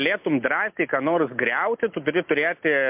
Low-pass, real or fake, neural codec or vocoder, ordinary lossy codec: 3.6 kHz; real; none; MP3, 32 kbps